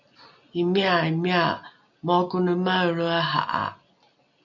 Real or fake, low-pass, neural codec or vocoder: real; 7.2 kHz; none